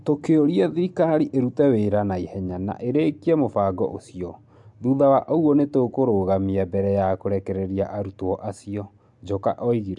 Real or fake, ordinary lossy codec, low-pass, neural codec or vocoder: real; MP3, 64 kbps; 10.8 kHz; none